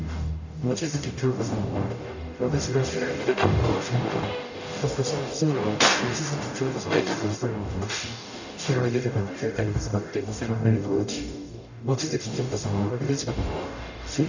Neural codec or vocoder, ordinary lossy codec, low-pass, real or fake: codec, 44.1 kHz, 0.9 kbps, DAC; none; 7.2 kHz; fake